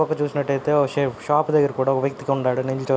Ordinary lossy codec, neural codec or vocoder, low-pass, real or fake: none; none; none; real